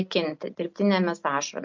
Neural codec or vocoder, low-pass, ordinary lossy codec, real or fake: none; 7.2 kHz; MP3, 48 kbps; real